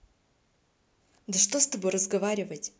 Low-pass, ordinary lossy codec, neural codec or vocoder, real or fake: none; none; none; real